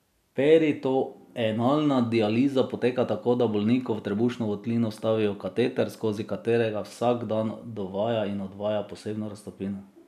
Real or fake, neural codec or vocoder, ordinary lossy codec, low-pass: real; none; none; 14.4 kHz